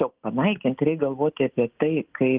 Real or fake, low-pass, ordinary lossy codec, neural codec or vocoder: real; 3.6 kHz; Opus, 32 kbps; none